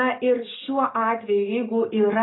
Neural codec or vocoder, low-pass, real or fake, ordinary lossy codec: none; 7.2 kHz; real; AAC, 16 kbps